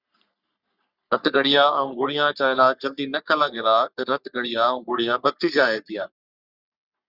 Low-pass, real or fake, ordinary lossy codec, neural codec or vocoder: 5.4 kHz; fake; Opus, 64 kbps; codec, 44.1 kHz, 3.4 kbps, Pupu-Codec